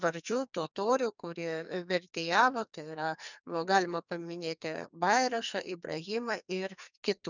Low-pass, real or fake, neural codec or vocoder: 7.2 kHz; fake; codec, 44.1 kHz, 2.6 kbps, SNAC